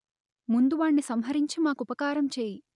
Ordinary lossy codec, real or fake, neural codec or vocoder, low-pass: none; real; none; 10.8 kHz